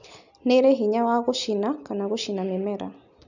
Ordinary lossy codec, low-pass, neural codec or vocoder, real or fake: none; 7.2 kHz; none; real